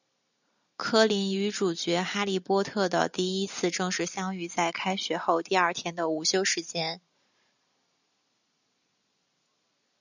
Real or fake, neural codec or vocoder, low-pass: real; none; 7.2 kHz